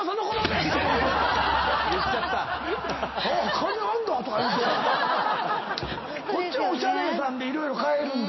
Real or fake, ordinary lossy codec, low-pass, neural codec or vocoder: real; MP3, 24 kbps; 7.2 kHz; none